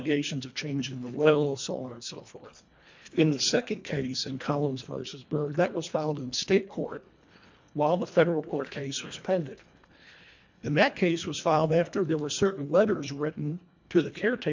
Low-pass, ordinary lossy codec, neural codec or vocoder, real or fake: 7.2 kHz; MP3, 64 kbps; codec, 24 kHz, 1.5 kbps, HILCodec; fake